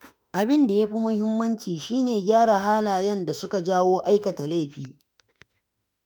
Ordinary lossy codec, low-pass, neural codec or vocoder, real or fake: none; none; autoencoder, 48 kHz, 32 numbers a frame, DAC-VAE, trained on Japanese speech; fake